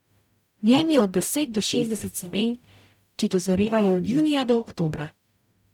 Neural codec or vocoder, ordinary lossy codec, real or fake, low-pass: codec, 44.1 kHz, 0.9 kbps, DAC; none; fake; 19.8 kHz